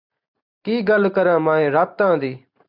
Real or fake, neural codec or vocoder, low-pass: fake; vocoder, 44.1 kHz, 128 mel bands every 512 samples, BigVGAN v2; 5.4 kHz